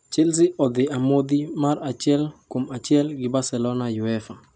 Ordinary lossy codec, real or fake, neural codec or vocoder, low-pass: none; real; none; none